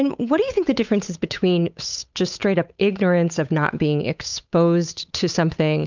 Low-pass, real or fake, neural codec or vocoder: 7.2 kHz; fake; codec, 16 kHz, 8 kbps, FunCodec, trained on Chinese and English, 25 frames a second